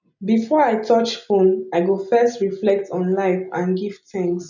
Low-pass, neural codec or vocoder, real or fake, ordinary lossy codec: 7.2 kHz; none; real; none